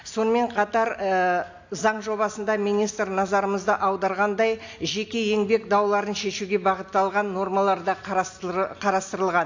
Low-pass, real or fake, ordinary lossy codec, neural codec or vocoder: 7.2 kHz; real; AAC, 48 kbps; none